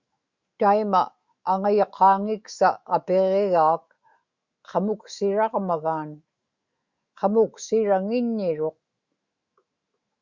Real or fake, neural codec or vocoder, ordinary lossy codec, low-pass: fake; autoencoder, 48 kHz, 128 numbers a frame, DAC-VAE, trained on Japanese speech; Opus, 64 kbps; 7.2 kHz